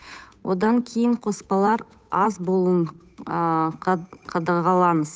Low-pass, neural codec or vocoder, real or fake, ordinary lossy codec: none; codec, 16 kHz, 8 kbps, FunCodec, trained on Chinese and English, 25 frames a second; fake; none